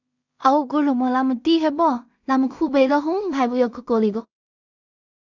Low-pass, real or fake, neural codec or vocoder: 7.2 kHz; fake; codec, 16 kHz in and 24 kHz out, 0.4 kbps, LongCat-Audio-Codec, two codebook decoder